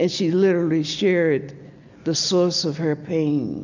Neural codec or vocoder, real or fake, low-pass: none; real; 7.2 kHz